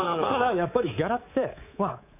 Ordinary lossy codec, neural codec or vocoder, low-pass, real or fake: none; codec, 24 kHz, 3.1 kbps, DualCodec; 3.6 kHz; fake